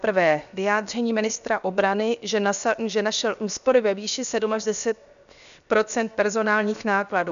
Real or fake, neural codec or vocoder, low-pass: fake; codec, 16 kHz, 0.7 kbps, FocalCodec; 7.2 kHz